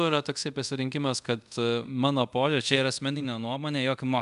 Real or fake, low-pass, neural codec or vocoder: fake; 10.8 kHz; codec, 24 kHz, 0.5 kbps, DualCodec